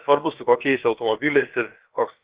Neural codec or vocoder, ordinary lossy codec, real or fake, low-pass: codec, 16 kHz, about 1 kbps, DyCAST, with the encoder's durations; Opus, 16 kbps; fake; 3.6 kHz